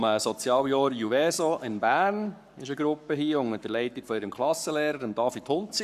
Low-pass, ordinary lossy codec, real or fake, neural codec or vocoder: 14.4 kHz; none; fake; codec, 44.1 kHz, 7.8 kbps, Pupu-Codec